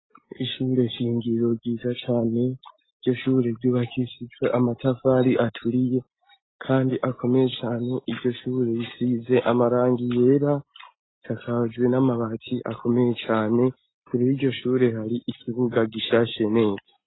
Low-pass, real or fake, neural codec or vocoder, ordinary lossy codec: 7.2 kHz; real; none; AAC, 16 kbps